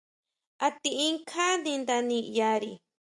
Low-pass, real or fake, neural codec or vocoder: 10.8 kHz; real; none